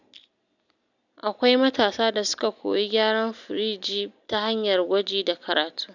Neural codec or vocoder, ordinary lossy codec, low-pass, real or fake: none; none; 7.2 kHz; real